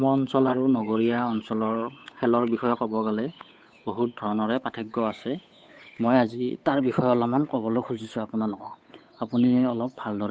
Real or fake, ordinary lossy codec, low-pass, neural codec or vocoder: fake; none; none; codec, 16 kHz, 8 kbps, FunCodec, trained on Chinese and English, 25 frames a second